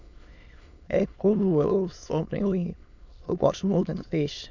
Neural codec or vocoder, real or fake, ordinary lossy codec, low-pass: autoencoder, 22.05 kHz, a latent of 192 numbers a frame, VITS, trained on many speakers; fake; none; 7.2 kHz